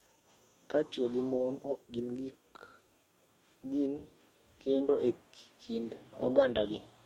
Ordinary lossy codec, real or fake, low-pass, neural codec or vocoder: MP3, 64 kbps; fake; 19.8 kHz; codec, 44.1 kHz, 2.6 kbps, DAC